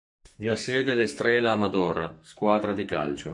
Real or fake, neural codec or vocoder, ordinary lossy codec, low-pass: fake; codec, 44.1 kHz, 2.6 kbps, SNAC; MP3, 64 kbps; 10.8 kHz